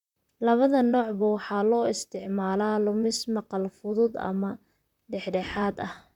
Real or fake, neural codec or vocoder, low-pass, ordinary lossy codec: fake; vocoder, 44.1 kHz, 128 mel bands, Pupu-Vocoder; 19.8 kHz; none